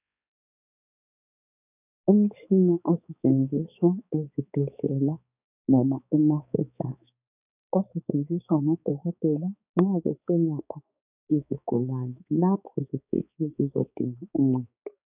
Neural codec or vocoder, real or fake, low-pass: codec, 16 kHz, 4 kbps, X-Codec, HuBERT features, trained on balanced general audio; fake; 3.6 kHz